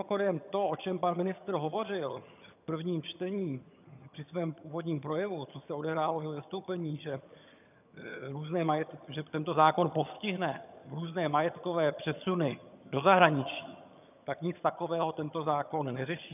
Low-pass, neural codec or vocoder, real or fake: 3.6 kHz; vocoder, 22.05 kHz, 80 mel bands, HiFi-GAN; fake